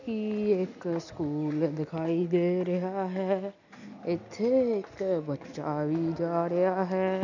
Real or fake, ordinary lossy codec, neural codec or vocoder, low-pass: real; none; none; 7.2 kHz